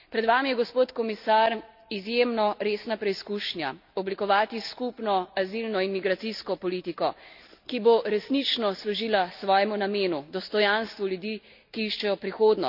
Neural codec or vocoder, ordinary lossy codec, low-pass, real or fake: none; none; 5.4 kHz; real